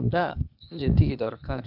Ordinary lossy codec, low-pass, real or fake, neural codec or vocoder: none; 5.4 kHz; fake; codec, 16 kHz, 0.8 kbps, ZipCodec